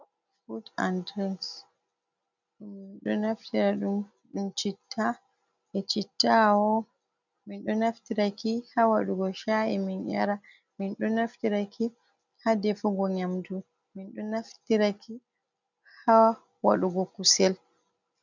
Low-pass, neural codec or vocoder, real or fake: 7.2 kHz; none; real